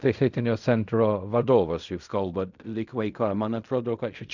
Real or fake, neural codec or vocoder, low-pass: fake; codec, 16 kHz in and 24 kHz out, 0.4 kbps, LongCat-Audio-Codec, fine tuned four codebook decoder; 7.2 kHz